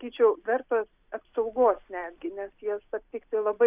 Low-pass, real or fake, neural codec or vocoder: 3.6 kHz; real; none